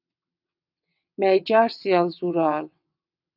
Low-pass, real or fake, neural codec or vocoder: 5.4 kHz; fake; vocoder, 22.05 kHz, 80 mel bands, WaveNeXt